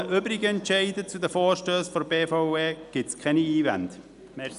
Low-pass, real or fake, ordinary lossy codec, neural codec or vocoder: 10.8 kHz; real; none; none